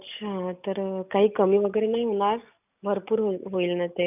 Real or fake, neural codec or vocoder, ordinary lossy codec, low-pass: real; none; none; 3.6 kHz